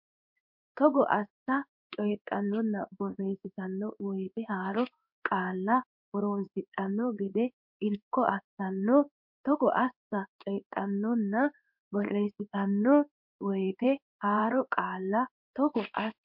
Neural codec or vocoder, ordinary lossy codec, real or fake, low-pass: codec, 16 kHz in and 24 kHz out, 1 kbps, XY-Tokenizer; MP3, 48 kbps; fake; 5.4 kHz